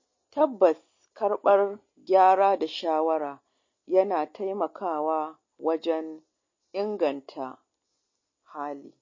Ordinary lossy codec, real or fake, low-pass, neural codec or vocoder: MP3, 32 kbps; real; 7.2 kHz; none